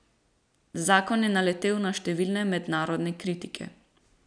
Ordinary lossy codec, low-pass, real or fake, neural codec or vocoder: none; 9.9 kHz; real; none